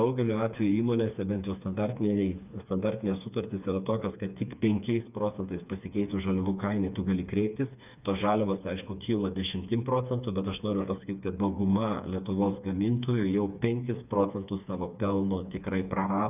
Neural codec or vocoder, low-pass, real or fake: codec, 16 kHz, 4 kbps, FreqCodec, smaller model; 3.6 kHz; fake